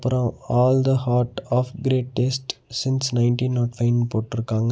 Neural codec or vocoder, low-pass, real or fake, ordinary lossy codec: none; none; real; none